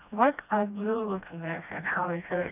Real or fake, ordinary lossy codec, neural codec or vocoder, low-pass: fake; none; codec, 16 kHz, 1 kbps, FreqCodec, smaller model; 3.6 kHz